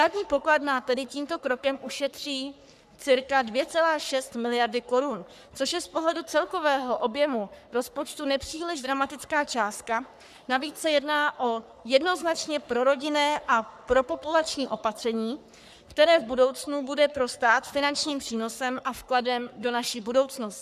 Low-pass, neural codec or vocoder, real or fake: 14.4 kHz; codec, 44.1 kHz, 3.4 kbps, Pupu-Codec; fake